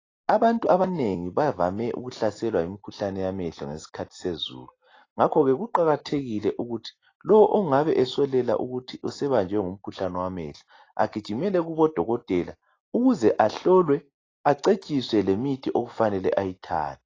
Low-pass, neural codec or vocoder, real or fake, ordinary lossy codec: 7.2 kHz; none; real; AAC, 32 kbps